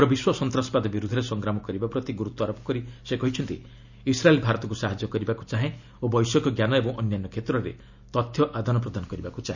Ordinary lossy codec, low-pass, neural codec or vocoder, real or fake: none; 7.2 kHz; none; real